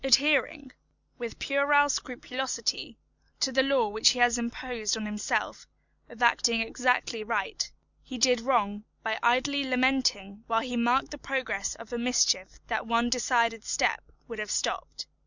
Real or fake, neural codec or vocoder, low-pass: real; none; 7.2 kHz